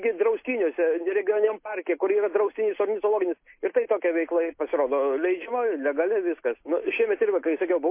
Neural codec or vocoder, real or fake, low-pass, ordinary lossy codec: none; real; 3.6 kHz; MP3, 24 kbps